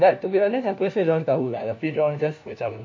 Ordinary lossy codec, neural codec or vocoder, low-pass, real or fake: MP3, 48 kbps; codec, 16 kHz, 1 kbps, FunCodec, trained on LibriTTS, 50 frames a second; 7.2 kHz; fake